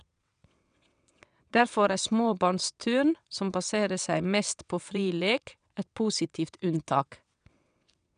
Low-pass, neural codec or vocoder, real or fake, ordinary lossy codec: 9.9 kHz; vocoder, 22.05 kHz, 80 mel bands, WaveNeXt; fake; none